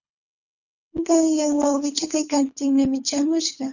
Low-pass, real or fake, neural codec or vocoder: 7.2 kHz; fake; codec, 24 kHz, 3 kbps, HILCodec